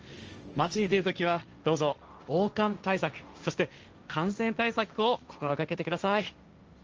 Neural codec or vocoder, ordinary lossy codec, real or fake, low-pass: codec, 16 kHz, 1.1 kbps, Voila-Tokenizer; Opus, 24 kbps; fake; 7.2 kHz